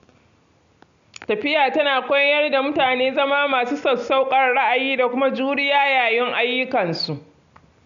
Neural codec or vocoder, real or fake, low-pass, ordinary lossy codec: none; real; 7.2 kHz; none